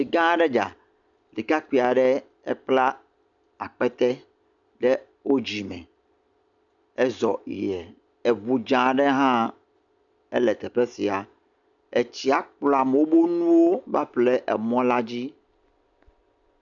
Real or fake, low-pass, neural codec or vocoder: real; 7.2 kHz; none